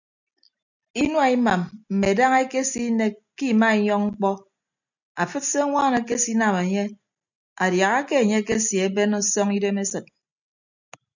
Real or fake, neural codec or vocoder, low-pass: real; none; 7.2 kHz